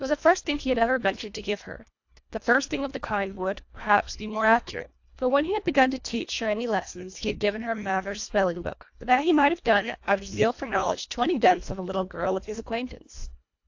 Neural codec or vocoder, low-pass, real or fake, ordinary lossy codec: codec, 24 kHz, 1.5 kbps, HILCodec; 7.2 kHz; fake; AAC, 48 kbps